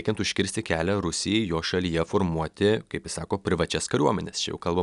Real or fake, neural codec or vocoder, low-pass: real; none; 10.8 kHz